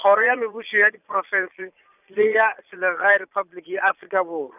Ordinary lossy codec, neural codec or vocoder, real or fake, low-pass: none; vocoder, 22.05 kHz, 80 mel bands, Vocos; fake; 3.6 kHz